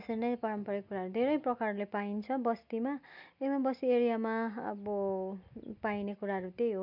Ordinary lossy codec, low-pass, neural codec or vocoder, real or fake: none; 5.4 kHz; none; real